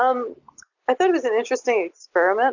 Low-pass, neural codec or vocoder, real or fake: 7.2 kHz; none; real